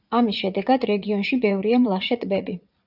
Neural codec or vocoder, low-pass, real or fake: vocoder, 44.1 kHz, 80 mel bands, Vocos; 5.4 kHz; fake